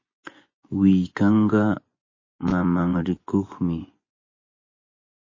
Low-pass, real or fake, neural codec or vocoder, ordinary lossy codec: 7.2 kHz; fake; vocoder, 24 kHz, 100 mel bands, Vocos; MP3, 32 kbps